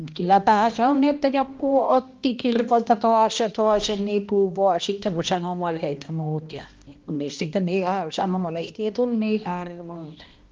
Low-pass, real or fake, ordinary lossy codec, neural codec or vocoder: 7.2 kHz; fake; Opus, 32 kbps; codec, 16 kHz, 1 kbps, X-Codec, HuBERT features, trained on balanced general audio